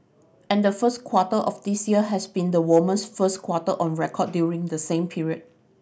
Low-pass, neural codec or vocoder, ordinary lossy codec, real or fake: none; none; none; real